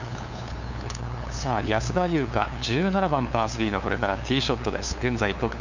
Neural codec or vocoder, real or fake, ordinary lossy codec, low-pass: codec, 16 kHz, 2 kbps, FunCodec, trained on LibriTTS, 25 frames a second; fake; none; 7.2 kHz